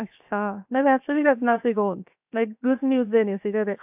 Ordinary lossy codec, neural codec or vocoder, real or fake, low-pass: AAC, 32 kbps; codec, 16 kHz, 0.7 kbps, FocalCodec; fake; 3.6 kHz